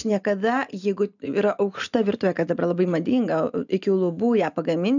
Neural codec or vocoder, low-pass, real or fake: none; 7.2 kHz; real